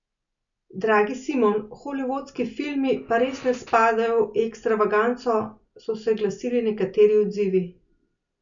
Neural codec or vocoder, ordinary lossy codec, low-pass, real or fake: none; none; 7.2 kHz; real